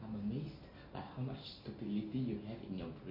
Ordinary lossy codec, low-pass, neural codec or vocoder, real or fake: none; 5.4 kHz; none; real